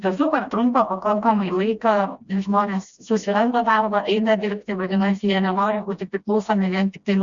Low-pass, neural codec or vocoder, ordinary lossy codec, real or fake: 7.2 kHz; codec, 16 kHz, 1 kbps, FreqCodec, smaller model; Opus, 64 kbps; fake